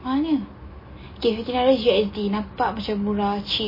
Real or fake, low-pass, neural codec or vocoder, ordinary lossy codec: real; 5.4 kHz; none; MP3, 24 kbps